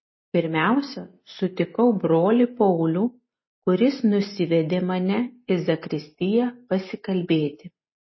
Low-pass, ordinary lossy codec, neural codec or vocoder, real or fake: 7.2 kHz; MP3, 24 kbps; none; real